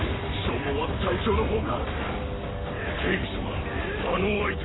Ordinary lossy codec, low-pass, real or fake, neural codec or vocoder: AAC, 16 kbps; 7.2 kHz; fake; codec, 44.1 kHz, 7.8 kbps, Pupu-Codec